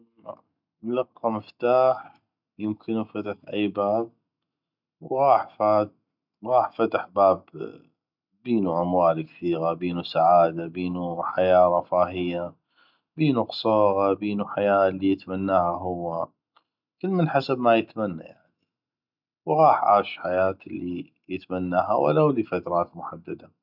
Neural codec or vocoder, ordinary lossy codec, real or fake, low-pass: none; none; real; 5.4 kHz